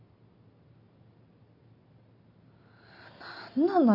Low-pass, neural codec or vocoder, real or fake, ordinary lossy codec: 5.4 kHz; none; real; none